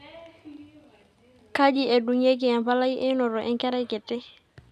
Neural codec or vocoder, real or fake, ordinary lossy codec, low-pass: none; real; none; 14.4 kHz